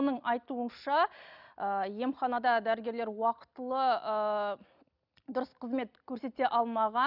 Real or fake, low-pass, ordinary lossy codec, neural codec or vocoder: real; 5.4 kHz; none; none